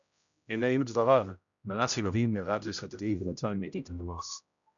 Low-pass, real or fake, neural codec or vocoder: 7.2 kHz; fake; codec, 16 kHz, 0.5 kbps, X-Codec, HuBERT features, trained on general audio